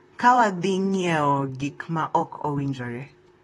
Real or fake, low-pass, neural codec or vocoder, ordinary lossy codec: fake; 19.8 kHz; vocoder, 44.1 kHz, 128 mel bands, Pupu-Vocoder; AAC, 32 kbps